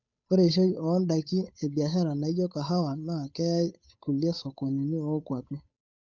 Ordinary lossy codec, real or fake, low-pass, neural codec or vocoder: MP3, 64 kbps; fake; 7.2 kHz; codec, 16 kHz, 8 kbps, FunCodec, trained on Chinese and English, 25 frames a second